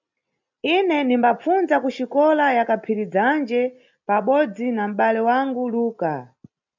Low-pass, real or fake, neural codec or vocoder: 7.2 kHz; real; none